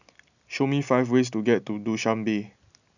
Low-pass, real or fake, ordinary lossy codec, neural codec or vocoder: 7.2 kHz; real; none; none